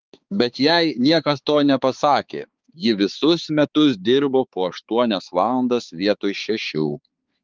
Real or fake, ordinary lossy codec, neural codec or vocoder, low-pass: fake; Opus, 32 kbps; codec, 16 kHz, 4 kbps, X-Codec, HuBERT features, trained on balanced general audio; 7.2 kHz